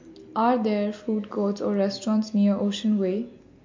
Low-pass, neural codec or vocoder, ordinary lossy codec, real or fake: 7.2 kHz; none; MP3, 48 kbps; real